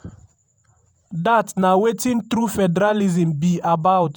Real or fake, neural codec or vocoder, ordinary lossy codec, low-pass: fake; vocoder, 48 kHz, 128 mel bands, Vocos; none; none